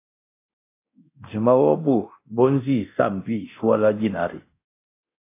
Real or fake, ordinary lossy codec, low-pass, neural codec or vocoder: fake; AAC, 24 kbps; 3.6 kHz; codec, 24 kHz, 0.9 kbps, DualCodec